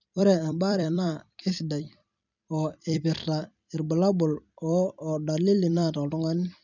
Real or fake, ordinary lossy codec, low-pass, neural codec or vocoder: fake; none; 7.2 kHz; vocoder, 44.1 kHz, 128 mel bands every 256 samples, BigVGAN v2